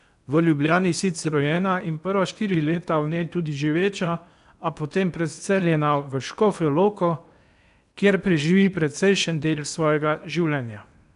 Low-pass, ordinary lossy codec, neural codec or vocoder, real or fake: 10.8 kHz; none; codec, 16 kHz in and 24 kHz out, 0.8 kbps, FocalCodec, streaming, 65536 codes; fake